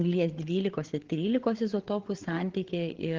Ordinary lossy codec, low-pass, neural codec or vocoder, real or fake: Opus, 16 kbps; 7.2 kHz; codec, 16 kHz, 8 kbps, FreqCodec, larger model; fake